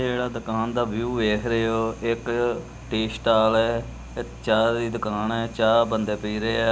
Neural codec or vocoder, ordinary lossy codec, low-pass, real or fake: none; none; none; real